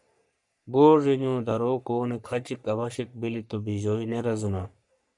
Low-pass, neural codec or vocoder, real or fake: 10.8 kHz; codec, 44.1 kHz, 3.4 kbps, Pupu-Codec; fake